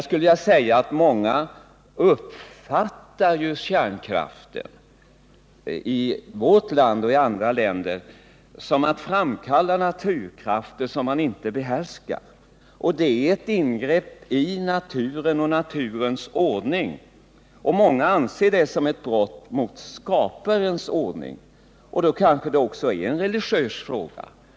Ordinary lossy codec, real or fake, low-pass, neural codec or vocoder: none; real; none; none